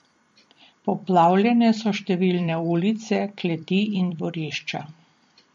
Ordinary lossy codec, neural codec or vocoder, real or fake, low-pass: MP3, 48 kbps; vocoder, 44.1 kHz, 128 mel bands every 256 samples, BigVGAN v2; fake; 19.8 kHz